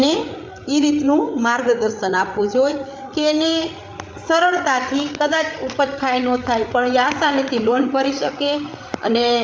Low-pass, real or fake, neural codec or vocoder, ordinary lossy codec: none; fake; codec, 16 kHz, 8 kbps, FreqCodec, larger model; none